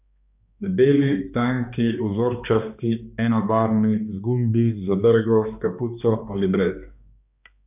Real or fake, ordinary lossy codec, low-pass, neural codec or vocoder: fake; none; 3.6 kHz; codec, 16 kHz, 2 kbps, X-Codec, HuBERT features, trained on balanced general audio